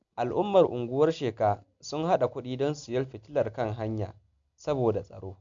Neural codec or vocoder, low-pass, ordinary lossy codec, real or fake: none; 7.2 kHz; MP3, 64 kbps; real